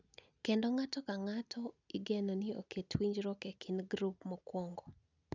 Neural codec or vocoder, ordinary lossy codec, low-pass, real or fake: none; none; 7.2 kHz; real